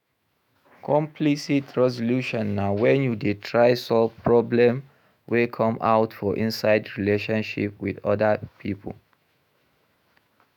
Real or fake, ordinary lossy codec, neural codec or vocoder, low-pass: fake; none; autoencoder, 48 kHz, 128 numbers a frame, DAC-VAE, trained on Japanese speech; 19.8 kHz